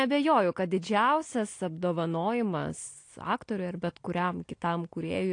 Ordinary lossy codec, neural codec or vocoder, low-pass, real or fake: AAC, 48 kbps; none; 9.9 kHz; real